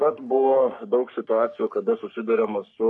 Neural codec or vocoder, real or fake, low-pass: codec, 44.1 kHz, 3.4 kbps, Pupu-Codec; fake; 10.8 kHz